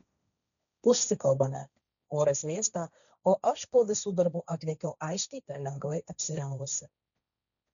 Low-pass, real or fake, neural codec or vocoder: 7.2 kHz; fake; codec, 16 kHz, 1.1 kbps, Voila-Tokenizer